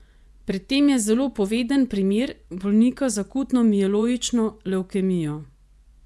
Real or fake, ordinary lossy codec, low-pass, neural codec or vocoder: fake; none; none; vocoder, 24 kHz, 100 mel bands, Vocos